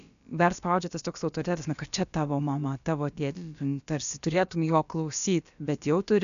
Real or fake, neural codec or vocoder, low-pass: fake; codec, 16 kHz, about 1 kbps, DyCAST, with the encoder's durations; 7.2 kHz